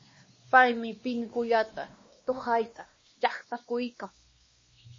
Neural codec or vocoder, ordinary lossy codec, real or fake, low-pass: codec, 16 kHz, 2 kbps, X-Codec, WavLM features, trained on Multilingual LibriSpeech; MP3, 32 kbps; fake; 7.2 kHz